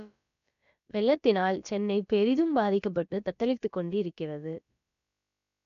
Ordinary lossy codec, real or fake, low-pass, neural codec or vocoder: none; fake; 7.2 kHz; codec, 16 kHz, about 1 kbps, DyCAST, with the encoder's durations